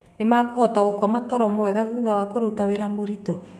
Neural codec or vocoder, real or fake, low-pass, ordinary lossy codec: codec, 32 kHz, 1.9 kbps, SNAC; fake; 14.4 kHz; none